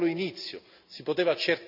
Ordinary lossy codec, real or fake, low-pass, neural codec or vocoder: none; real; 5.4 kHz; none